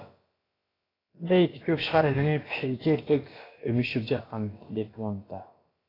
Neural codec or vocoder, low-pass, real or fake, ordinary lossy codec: codec, 16 kHz, about 1 kbps, DyCAST, with the encoder's durations; 5.4 kHz; fake; AAC, 24 kbps